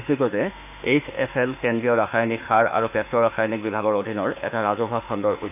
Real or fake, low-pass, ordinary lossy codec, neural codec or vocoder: fake; 3.6 kHz; none; autoencoder, 48 kHz, 32 numbers a frame, DAC-VAE, trained on Japanese speech